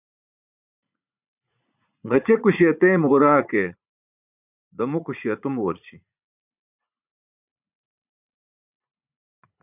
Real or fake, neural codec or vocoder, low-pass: fake; vocoder, 44.1 kHz, 80 mel bands, Vocos; 3.6 kHz